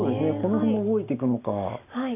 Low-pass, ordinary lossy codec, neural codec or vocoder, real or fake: 3.6 kHz; none; none; real